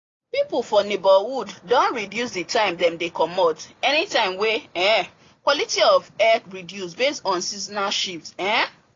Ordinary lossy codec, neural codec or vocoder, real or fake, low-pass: AAC, 32 kbps; none; real; 7.2 kHz